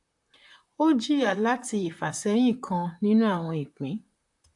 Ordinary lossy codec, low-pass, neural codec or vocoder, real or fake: AAC, 64 kbps; 10.8 kHz; vocoder, 44.1 kHz, 128 mel bands, Pupu-Vocoder; fake